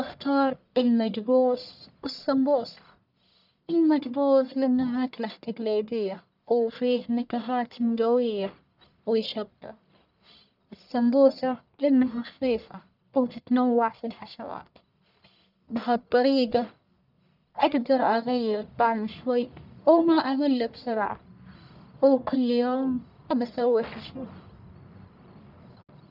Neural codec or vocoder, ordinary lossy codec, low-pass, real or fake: codec, 44.1 kHz, 1.7 kbps, Pupu-Codec; none; 5.4 kHz; fake